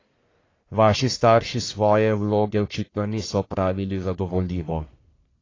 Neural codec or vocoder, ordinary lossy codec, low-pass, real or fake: codec, 44.1 kHz, 1.7 kbps, Pupu-Codec; AAC, 32 kbps; 7.2 kHz; fake